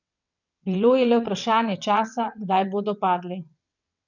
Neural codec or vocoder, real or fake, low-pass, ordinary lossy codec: vocoder, 22.05 kHz, 80 mel bands, WaveNeXt; fake; 7.2 kHz; none